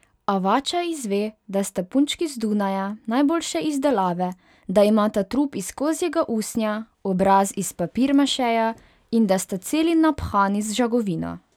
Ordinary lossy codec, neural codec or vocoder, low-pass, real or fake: none; none; 19.8 kHz; real